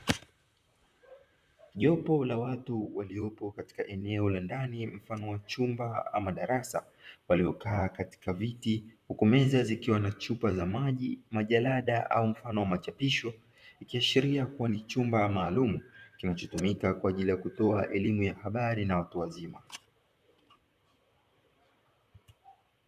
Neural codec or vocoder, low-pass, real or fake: vocoder, 44.1 kHz, 128 mel bands, Pupu-Vocoder; 14.4 kHz; fake